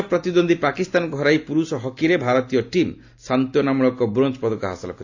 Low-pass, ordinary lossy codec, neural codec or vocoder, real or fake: 7.2 kHz; AAC, 48 kbps; none; real